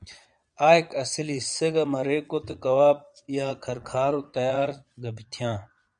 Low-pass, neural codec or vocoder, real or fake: 9.9 kHz; vocoder, 22.05 kHz, 80 mel bands, Vocos; fake